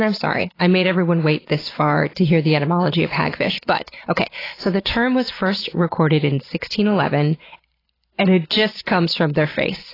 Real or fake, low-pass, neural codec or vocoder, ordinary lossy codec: real; 5.4 kHz; none; AAC, 24 kbps